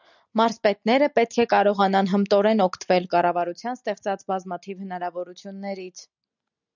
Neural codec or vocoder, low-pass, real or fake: none; 7.2 kHz; real